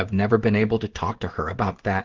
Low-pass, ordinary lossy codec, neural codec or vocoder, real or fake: 7.2 kHz; Opus, 16 kbps; codec, 16 kHz in and 24 kHz out, 1 kbps, XY-Tokenizer; fake